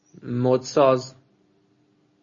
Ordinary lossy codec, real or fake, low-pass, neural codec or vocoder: MP3, 32 kbps; real; 7.2 kHz; none